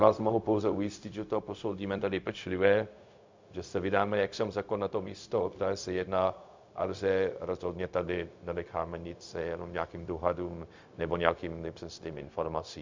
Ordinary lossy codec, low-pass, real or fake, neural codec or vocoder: MP3, 64 kbps; 7.2 kHz; fake; codec, 16 kHz, 0.4 kbps, LongCat-Audio-Codec